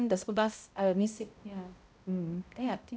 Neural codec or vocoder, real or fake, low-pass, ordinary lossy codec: codec, 16 kHz, 0.5 kbps, X-Codec, HuBERT features, trained on balanced general audio; fake; none; none